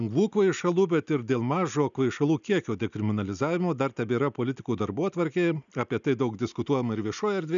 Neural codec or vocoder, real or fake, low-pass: none; real; 7.2 kHz